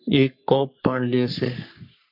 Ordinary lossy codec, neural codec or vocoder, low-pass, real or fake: MP3, 48 kbps; codec, 44.1 kHz, 3.4 kbps, Pupu-Codec; 5.4 kHz; fake